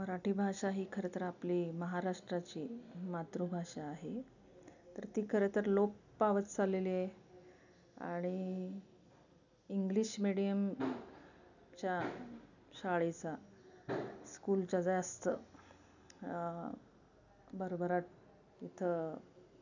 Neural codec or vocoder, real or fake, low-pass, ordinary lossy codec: none; real; 7.2 kHz; none